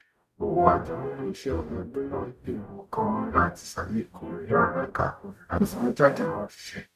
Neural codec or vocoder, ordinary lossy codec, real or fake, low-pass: codec, 44.1 kHz, 0.9 kbps, DAC; none; fake; 14.4 kHz